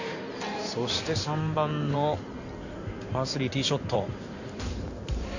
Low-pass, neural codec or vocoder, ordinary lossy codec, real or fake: 7.2 kHz; codec, 16 kHz in and 24 kHz out, 2.2 kbps, FireRedTTS-2 codec; none; fake